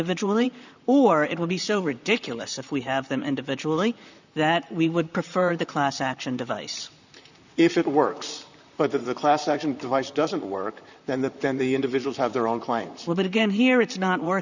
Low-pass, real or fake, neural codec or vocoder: 7.2 kHz; fake; vocoder, 44.1 kHz, 128 mel bands, Pupu-Vocoder